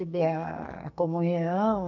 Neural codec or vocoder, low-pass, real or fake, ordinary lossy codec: codec, 44.1 kHz, 2.6 kbps, SNAC; 7.2 kHz; fake; none